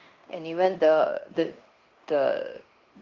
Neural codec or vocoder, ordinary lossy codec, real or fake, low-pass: codec, 16 kHz in and 24 kHz out, 0.9 kbps, LongCat-Audio-Codec, fine tuned four codebook decoder; Opus, 24 kbps; fake; 7.2 kHz